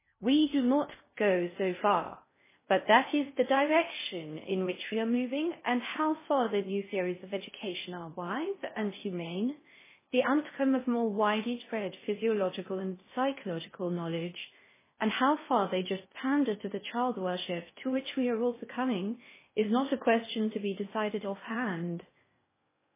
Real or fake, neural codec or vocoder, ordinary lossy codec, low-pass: fake; codec, 16 kHz in and 24 kHz out, 0.6 kbps, FocalCodec, streaming, 4096 codes; MP3, 16 kbps; 3.6 kHz